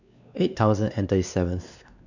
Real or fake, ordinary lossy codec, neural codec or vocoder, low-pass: fake; none; codec, 16 kHz, 2 kbps, X-Codec, WavLM features, trained on Multilingual LibriSpeech; 7.2 kHz